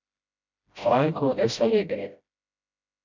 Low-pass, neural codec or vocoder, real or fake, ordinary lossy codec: 7.2 kHz; codec, 16 kHz, 0.5 kbps, FreqCodec, smaller model; fake; MP3, 64 kbps